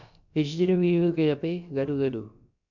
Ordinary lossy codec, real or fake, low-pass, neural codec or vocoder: none; fake; 7.2 kHz; codec, 16 kHz, about 1 kbps, DyCAST, with the encoder's durations